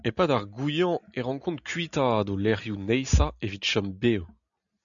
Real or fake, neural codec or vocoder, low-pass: real; none; 7.2 kHz